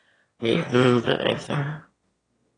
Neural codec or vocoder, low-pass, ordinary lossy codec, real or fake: autoencoder, 22.05 kHz, a latent of 192 numbers a frame, VITS, trained on one speaker; 9.9 kHz; AAC, 32 kbps; fake